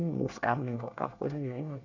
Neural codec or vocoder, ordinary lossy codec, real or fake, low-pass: codec, 24 kHz, 1 kbps, SNAC; none; fake; 7.2 kHz